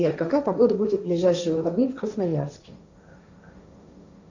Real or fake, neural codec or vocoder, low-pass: fake; codec, 16 kHz, 1.1 kbps, Voila-Tokenizer; 7.2 kHz